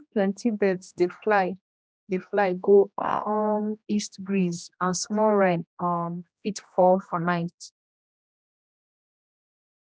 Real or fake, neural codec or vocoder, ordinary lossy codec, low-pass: fake; codec, 16 kHz, 1 kbps, X-Codec, HuBERT features, trained on general audio; none; none